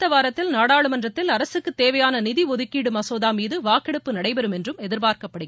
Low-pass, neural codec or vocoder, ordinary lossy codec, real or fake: none; none; none; real